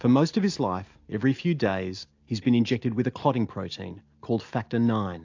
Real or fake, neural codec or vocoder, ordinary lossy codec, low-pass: real; none; AAC, 48 kbps; 7.2 kHz